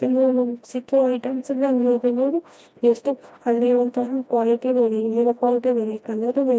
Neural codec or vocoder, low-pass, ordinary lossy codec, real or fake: codec, 16 kHz, 1 kbps, FreqCodec, smaller model; none; none; fake